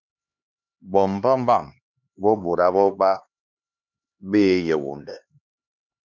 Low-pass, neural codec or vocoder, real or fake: 7.2 kHz; codec, 16 kHz, 2 kbps, X-Codec, HuBERT features, trained on LibriSpeech; fake